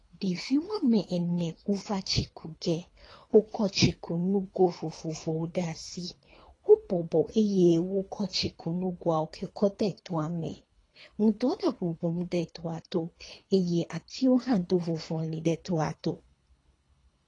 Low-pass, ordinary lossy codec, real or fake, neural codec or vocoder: 10.8 kHz; AAC, 32 kbps; fake; codec, 24 kHz, 3 kbps, HILCodec